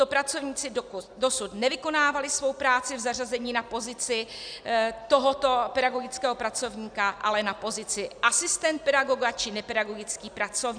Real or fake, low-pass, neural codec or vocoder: real; 9.9 kHz; none